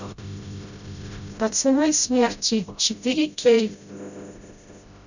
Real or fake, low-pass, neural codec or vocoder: fake; 7.2 kHz; codec, 16 kHz, 0.5 kbps, FreqCodec, smaller model